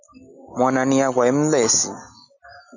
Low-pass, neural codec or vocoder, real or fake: 7.2 kHz; none; real